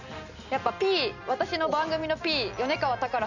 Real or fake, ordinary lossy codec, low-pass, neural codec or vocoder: real; none; 7.2 kHz; none